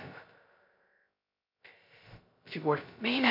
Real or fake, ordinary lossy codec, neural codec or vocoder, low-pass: fake; MP3, 48 kbps; codec, 16 kHz, 0.2 kbps, FocalCodec; 5.4 kHz